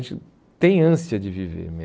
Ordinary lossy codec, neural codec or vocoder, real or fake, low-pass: none; none; real; none